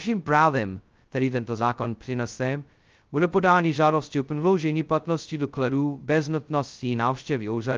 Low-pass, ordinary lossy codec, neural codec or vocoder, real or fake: 7.2 kHz; Opus, 24 kbps; codec, 16 kHz, 0.2 kbps, FocalCodec; fake